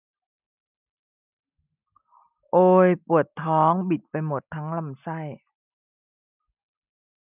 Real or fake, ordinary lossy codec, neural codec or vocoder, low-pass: real; none; none; 3.6 kHz